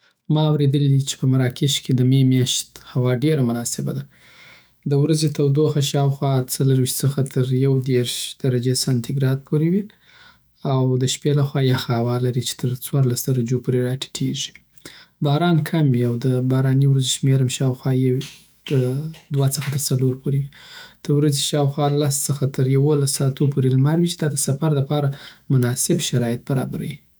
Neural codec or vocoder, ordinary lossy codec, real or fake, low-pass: autoencoder, 48 kHz, 128 numbers a frame, DAC-VAE, trained on Japanese speech; none; fake; none